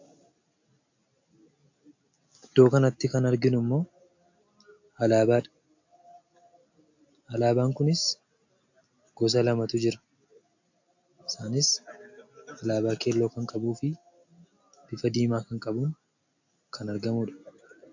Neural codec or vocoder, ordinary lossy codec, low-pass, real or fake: none; AAC, 48 kbps; 7.2 kHz; real